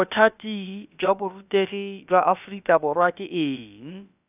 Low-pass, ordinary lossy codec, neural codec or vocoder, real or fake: 3.6 kHz; none; codec, 16 kHz, about 1 kbps, DyCAST, with the encoder's durations; fake